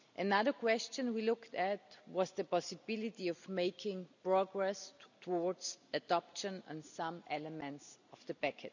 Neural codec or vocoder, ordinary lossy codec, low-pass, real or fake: none; none; 7.2 kHz; real